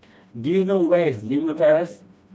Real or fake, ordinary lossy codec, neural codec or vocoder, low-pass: fake; none; codec, 16 kHz, 1 kbps, FreqCodec, smaller model; none